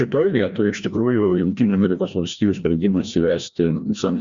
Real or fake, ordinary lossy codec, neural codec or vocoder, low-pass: fake; Opus, 64 kbps; codec, 16 kHz, 1 kbps, FreqCodec, larger model; 7.2 kHz